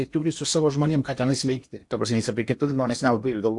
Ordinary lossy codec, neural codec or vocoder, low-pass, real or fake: AAC, 64 kbps; codec, 16 kHz in and 24 kHz out, 0.8 kbps, FocalCodec, streaming, 65536 codes; 10.8 kHz; fake